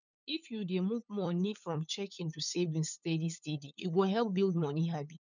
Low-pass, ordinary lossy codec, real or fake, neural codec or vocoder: 7.2 kHz; none; fake; codec, 16 kHz, 8 kbps, FunCodec, trained on LibriTTS, 25 frames a second